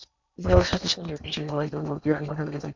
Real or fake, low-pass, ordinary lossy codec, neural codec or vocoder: fake; 7.2 kHz; MP3, 64 kbps; codec, 16 kHz in and 24 kHz out, 0.8 kbps, FocalCodec, streaming, 65536 codes